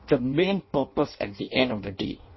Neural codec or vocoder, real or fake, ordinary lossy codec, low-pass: codec, 16 kHz in and 24 kHz out, 0.6 kbps, FireRedTTS-2 codec; fake; MP3, 24 kbps; 7.2 kHz